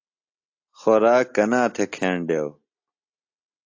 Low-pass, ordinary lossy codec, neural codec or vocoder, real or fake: 7.2 kHz; AAC, 48 kbps; none; real